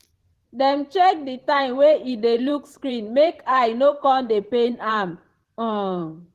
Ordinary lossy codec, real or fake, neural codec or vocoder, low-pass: Opus, 16 kbps; fake; vocoder, 44.1 kHz, 128 mel bands every 512 samples, BigVGAN v2; 14.4 kHz